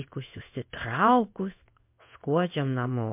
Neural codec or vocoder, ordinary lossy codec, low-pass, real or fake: codec, 16 kHz in and 24 kHz out, 1 kbps, XY-Tokenizer; MP3, 32 kbps; 3.6 kHz; fake